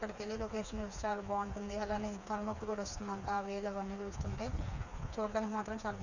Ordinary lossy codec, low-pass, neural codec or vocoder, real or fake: none; 7.2 kHz; codec, 16 kHz, 4 kbps, FreqCodec, smaller model; fake